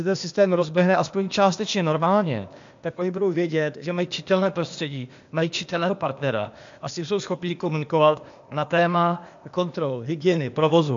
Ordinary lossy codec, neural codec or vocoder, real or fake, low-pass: AAC, 64 kbps; codec, 16 kHz, 0.8 kbps, ZipCodec; fake; 7.2 kHz